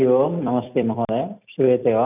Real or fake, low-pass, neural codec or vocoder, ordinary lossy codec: fake; 3.6 kHz; vocoder, 44.1 kHz, 128 mel bands every 256 samples, BigVGAN v2; none